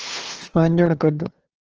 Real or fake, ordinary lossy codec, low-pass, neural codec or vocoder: fake; Opus, 16 kbps; 7.2 kHz; codec, 16 kHz, 2 kbps, FunCodec, trained on LibriTTS, 25 frames a second